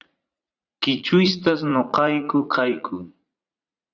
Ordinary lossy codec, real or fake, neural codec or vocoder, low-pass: Opus, 64 kbps; fake; vocoder, 44.1 kHz, 80 mel bands, Vocos; 7.2 kHz